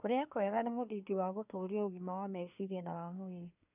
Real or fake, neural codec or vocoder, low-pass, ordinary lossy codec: fake; codec, 24 kHz, 1 kbps, SNAC; 3.6 kHz; none